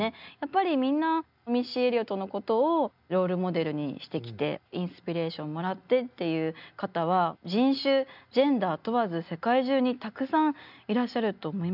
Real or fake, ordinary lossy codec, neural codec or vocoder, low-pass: real; none; none; 5.4 kHz